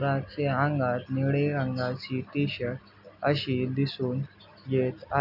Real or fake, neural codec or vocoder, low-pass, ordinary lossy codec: real; none; 5.4 kHz; none